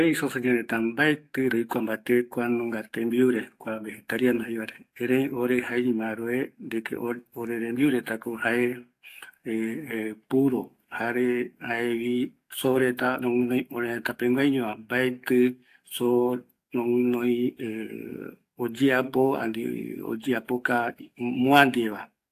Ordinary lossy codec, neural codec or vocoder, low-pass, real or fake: AAC, 64 kbps; codec, 44.1 kHz, 7.8 kbps, DAC; 14.4 kHz; fake